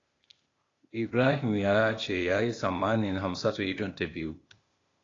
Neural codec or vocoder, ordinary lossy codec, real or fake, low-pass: codec, 16 kHz, 0.8 kbps, ZipCodec; AAC, 32 kbps; fake; 7.2 kHz